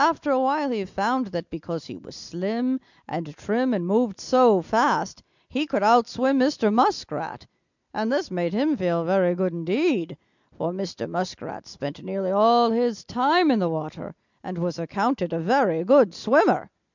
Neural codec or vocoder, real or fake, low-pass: none; real; 7.2 kHz